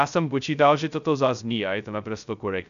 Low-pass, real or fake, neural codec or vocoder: 7.2 kHz; fake; codec, 16 kHz, 0.2 kbps, FocalCodec